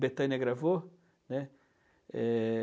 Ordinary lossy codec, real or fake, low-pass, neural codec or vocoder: none; real; none; none